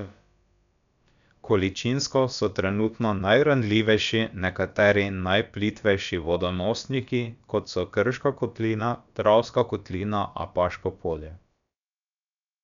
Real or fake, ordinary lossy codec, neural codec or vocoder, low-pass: fake; none; codec, 16 kHz, about 1 kbps, DyCAST, with the encoder's durations; 7.2 kHz